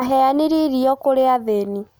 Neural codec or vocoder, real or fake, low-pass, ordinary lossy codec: none; real; none; none